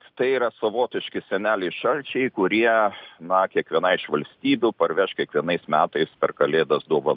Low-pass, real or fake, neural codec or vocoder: 5.4 kHz; real; none